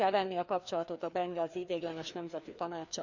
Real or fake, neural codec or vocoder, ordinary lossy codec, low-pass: fake; codec, 16 kHz, 2 kbps, FreqCodec, larger model; none; 7.2 kHz